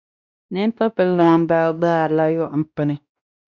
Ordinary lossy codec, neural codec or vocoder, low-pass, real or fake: Opus, 64 kbps; codec, 16 kHz, 1 kbps, X-Codec, WavLM features, trained on Multilingual LibriSpeech; 7.2 kHz; fake